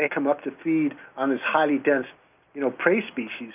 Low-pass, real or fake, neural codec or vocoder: 3.6 kHz; real; none